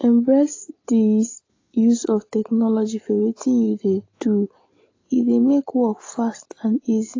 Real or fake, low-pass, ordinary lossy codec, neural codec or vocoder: real; 7.2 kHz; AAC, 32 kbps; none